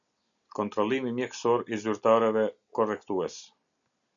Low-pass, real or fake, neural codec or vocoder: 7.2 kHz; real; none